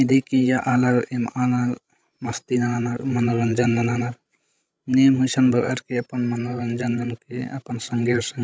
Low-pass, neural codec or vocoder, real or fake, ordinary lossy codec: none; codec, 16 kHz, 16 kbps, FreqCodec, larger model; fake; none